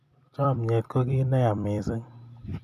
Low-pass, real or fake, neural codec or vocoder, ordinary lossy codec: 14.4 kHz; fake; vocoder, 44.1 kHz, 128 mel bands every 256 samples, BigVGAN v2; none